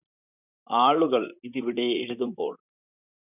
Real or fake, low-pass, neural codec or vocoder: fake; 3.6 kHz; vocoder, 44.1 kHz, 128 mel bands, Pupu-Vocoder